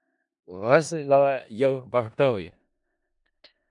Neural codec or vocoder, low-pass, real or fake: codec, 16 kHz in and 24 kHz out, 0.4 kbps, LongCat-Audio-Codec, four codebook decoder; 10.8 kHz; fake